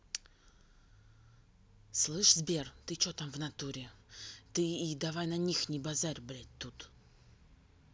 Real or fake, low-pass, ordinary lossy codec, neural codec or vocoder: real; none; none; none